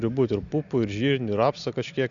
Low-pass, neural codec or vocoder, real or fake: 7.2 kHz; none; real